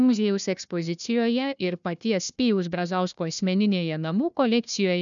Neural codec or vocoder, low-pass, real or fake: codec, 16 kHz, 1 kbps, FunCodec, trained on Chinese and English, 50 frames a second; 7.2 kHz; fake